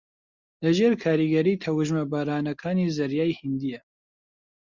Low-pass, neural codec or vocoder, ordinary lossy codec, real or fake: 7.2 kHz; none; Opus, 64 kbps; real